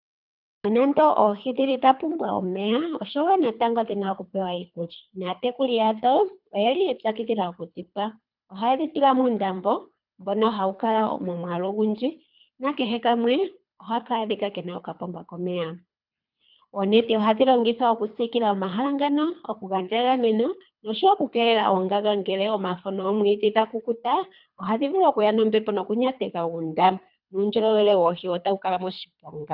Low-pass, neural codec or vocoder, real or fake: 5.4 kHz; codec, 24 kHz, 3 kbps, HILCodec; fake